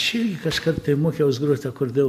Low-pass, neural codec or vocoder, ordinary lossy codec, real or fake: 14.4 kHz; none; MP3, 64 kbps; real